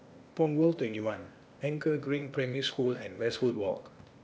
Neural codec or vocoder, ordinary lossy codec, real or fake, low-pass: codec, 16 kHz, 0.8 kbps, ZipCodec; none; fake; none